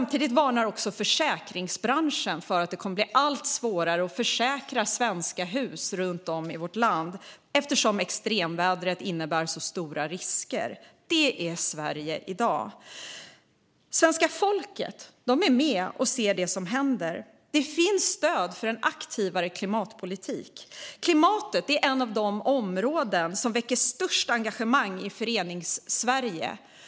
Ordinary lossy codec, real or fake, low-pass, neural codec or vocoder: none; real; none; none